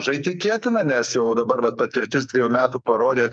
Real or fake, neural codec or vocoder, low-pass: fake; codec, 44.1 kHz, 2.6 kbps, SNAC; 9.9 kHz